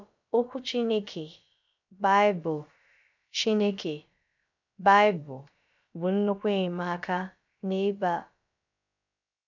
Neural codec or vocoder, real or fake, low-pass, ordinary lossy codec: codec, 16 kHz, about 1 kbps, DyCAST, with the encoder's durations; fake; 7.2 kHz; none